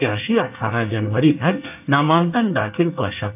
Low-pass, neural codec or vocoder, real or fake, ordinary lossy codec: 3.6 kHz; codec, 24 kHz, 1 kbps, SNAC; fake; AAC, 32 kbps